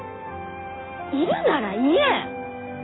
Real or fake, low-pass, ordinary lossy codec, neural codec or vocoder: real; 7.2 kHz; AAC, 16 kbps; none